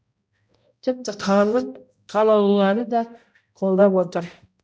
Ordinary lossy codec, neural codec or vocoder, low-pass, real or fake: none; codec, 16 kHz, 0.5 kbps, X-Codec, HuBERT features, trained on balanced general audio; none; fake